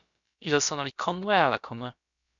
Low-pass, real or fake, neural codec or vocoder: 7.2 kHz; fake; codec, 16 kHz, about 1 kbps, DyCAST, with the encoder's durations